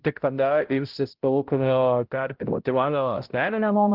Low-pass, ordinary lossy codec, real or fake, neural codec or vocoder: 5.4 kHz; Opus, 16 kbps; fake; codec, 16 kHz, 0.5 kbps, X-Codec, HuBERT features, trained on balanced general audio